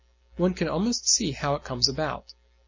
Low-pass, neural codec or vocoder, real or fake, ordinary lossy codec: 7.2 kHz; none; real; MP3, 32 kbps